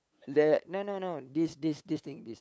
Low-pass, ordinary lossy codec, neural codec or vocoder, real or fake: none; none; codec, 16 kHz, 8 kbps, FunCodec, trained on LibriTTS, 25 frames a second; fake